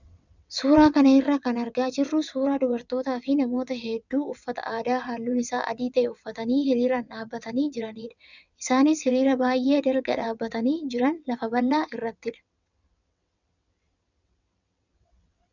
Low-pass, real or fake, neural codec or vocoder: 7.2 kHz; fake; vocoder, 22.05 kHz, 80 mel bands, WaveNeXt